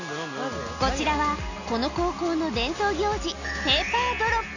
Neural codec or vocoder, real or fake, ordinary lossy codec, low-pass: none; real; none; 7.2 kHz